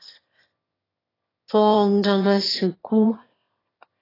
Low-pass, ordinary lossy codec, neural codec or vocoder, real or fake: 5.4 kHz; AAC, 24 kbps; autoencoder, 22.05 kHz, a latent of 192 numbers a frame, VITS, trained on one speaker; fake